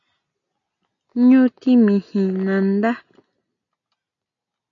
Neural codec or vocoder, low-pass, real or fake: none; 7.2 kHz; real